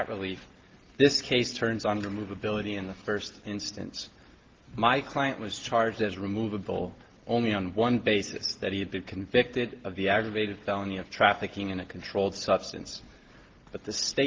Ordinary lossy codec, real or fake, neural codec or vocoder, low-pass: Opus, 16 kbps; real; none; 7.2 kHz